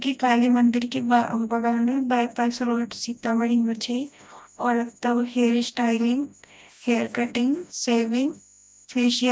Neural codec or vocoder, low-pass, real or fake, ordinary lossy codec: codec, 16 kHz, 1 kbps, FreqCodec, smaller model; none; fake; none